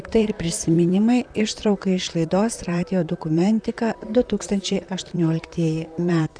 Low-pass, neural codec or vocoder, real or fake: 9.9 kHz; vocoder, 44.1 kHz, 128 mel bands, Pupu-Vocoder; fake